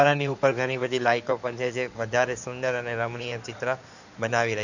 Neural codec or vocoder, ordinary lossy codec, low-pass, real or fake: codec, 16 kHz in and 24 kHz out, 2.2 kbps, FireRedTTS-2 codec; none; 7.2 kHz; fake